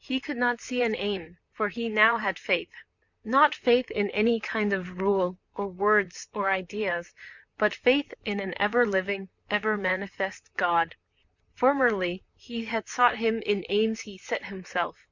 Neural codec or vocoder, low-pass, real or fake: vocoder, 44.1 kHz, 128 mel bands, Pupu-Vocoder; 7.2 kHz; fake